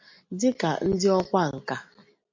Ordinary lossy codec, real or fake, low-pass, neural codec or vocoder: MP3, 48 kbps; real; 7.2 kHz; none